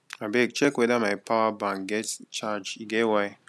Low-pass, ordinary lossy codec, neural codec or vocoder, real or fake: none; none; none; real